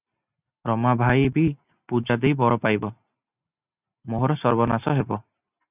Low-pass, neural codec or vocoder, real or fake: 3.6 kHz; none; real